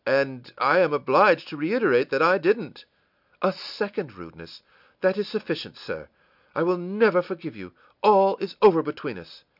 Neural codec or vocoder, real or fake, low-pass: none; real; 5.4 kHz